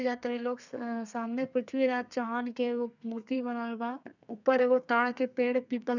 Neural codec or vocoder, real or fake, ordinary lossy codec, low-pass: codec, 32 kHz, 1.9 kbps, SNAC; fake; none; 7.2 kHz